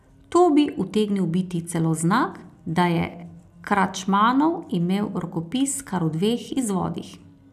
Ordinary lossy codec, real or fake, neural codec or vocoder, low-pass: none; real; none; 14.4 kHz